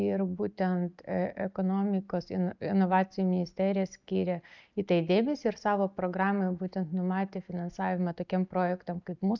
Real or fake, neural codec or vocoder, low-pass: real; none; 7.2 kHz